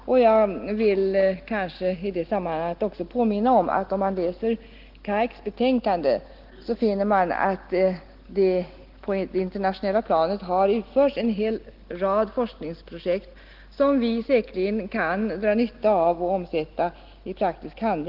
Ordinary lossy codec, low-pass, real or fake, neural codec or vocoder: Opus, 16 kbps; 5.4 kHz; real; none